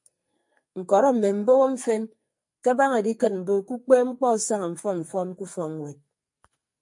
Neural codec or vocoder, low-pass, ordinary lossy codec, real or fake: codec, 44.1 kHz, 2.6 kbps, SNAC; 10.8 kHz; MP3, 48 kbps; fake